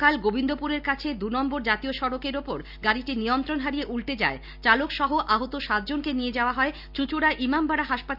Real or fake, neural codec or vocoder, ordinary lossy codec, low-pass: real; none; none; 5.4 kHz